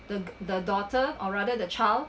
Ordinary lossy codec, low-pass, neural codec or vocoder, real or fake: none; none; none; real